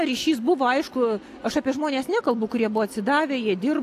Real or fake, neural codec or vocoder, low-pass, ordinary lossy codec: real; none; 14.4 kHz; AAC, 64 kbps